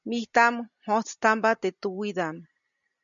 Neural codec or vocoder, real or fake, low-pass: none; real; 7.2 kHz